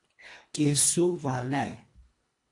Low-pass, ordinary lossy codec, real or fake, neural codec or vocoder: 10.8 kHz; AAC, 48 kbps; fake; codec, 24 kHz, 1.5 kbps, HILCodec